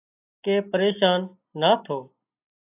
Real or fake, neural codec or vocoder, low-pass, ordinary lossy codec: real; none; 3.6 kHz; AAC, 24 kbps